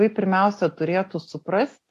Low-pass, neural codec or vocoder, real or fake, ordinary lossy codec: 14.4 kHz; none; real; AAC, 64 kbps